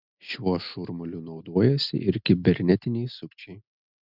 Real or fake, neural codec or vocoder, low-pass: real; none; 5.4 kHz